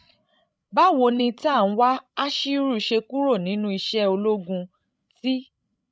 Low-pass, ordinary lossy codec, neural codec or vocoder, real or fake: none; none; codec, 16 kHz, 16 kbps, FreqCodec, larger model; fake